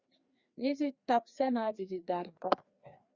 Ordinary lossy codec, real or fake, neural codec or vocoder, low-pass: Opus, 64 kbps; fake; codec, 16 kHz, 2 kbps, FreqCodec, larger model; 7.2 kHz